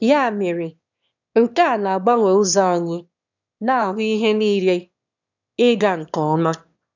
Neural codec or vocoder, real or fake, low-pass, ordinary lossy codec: autoencoder, 22.05 kHz, a latent of 192 numbers a frame, VITS, trained on one speaker; fake; 7.2 kHz; none